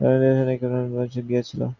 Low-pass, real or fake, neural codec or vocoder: 7.2 kHz; real; none